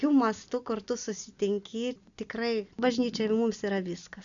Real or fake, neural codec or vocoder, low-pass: real; none; 7.2 kHz